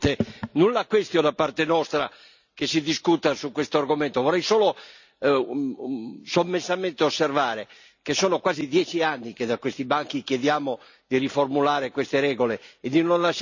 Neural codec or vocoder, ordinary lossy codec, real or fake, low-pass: none; none; real; 7.2 kHz